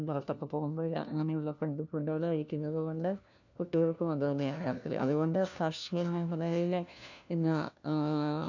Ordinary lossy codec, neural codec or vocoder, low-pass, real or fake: none; codec, 16 kHz, 1 kbps, FunCodec, trained on LibriTTS, 50 frames a second; 7.2 kHz; fake